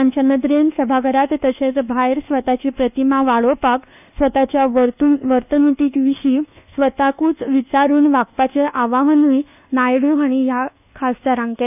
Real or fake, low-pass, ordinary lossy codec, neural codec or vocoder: fake; 3.6 kHz; none; codec, 24 kHz, 1.2 kbps, DualCodec